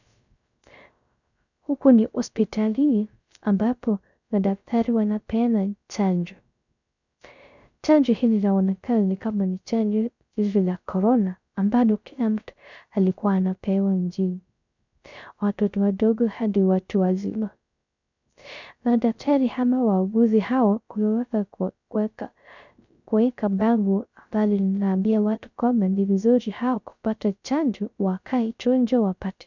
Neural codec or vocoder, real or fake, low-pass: codec, 16 kHz, 0.3 kbps, FocalCodec; fake; 7.2 kHz